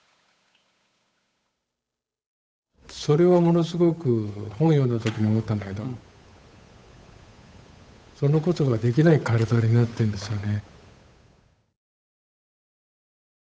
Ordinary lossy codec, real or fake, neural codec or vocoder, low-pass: none; fake; codec, 16 kHz, 8 kbps, FunCodec, trained on Chinese and English, 25 frames a second; none